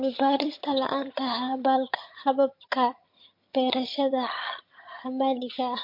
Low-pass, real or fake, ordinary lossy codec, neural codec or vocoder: 5.4 kHz; fake; MP3, 32 kbps; vocoder, 22.05 kHz, 80 mel bands, HiFi-GAN